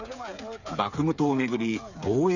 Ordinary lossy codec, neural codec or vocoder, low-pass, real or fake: none; codec, 16 kHz, 8 kbps, FreqCodec, smaller model; 7.2 kHz; fake